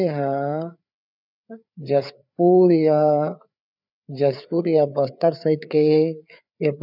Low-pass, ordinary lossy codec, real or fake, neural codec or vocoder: 5.4 kHz; none; fake; codec, 16 kHz, 4 kbps, FreqCodec, larger model